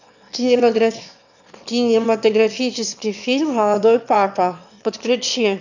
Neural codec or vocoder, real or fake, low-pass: autoencoder, 22.05 kHz, a latent of 192 numbers a frame, VITS, trained on one speaker; fake; 7.2 kHz